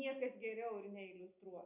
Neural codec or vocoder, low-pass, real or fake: none; 3.6 kHz; real